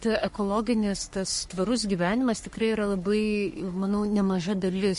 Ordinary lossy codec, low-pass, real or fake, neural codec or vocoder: MP3, 48 kbps; 14.4 kHz; fake; codec, 44.1 kHz, 3.4 kbps, Pupu-Codec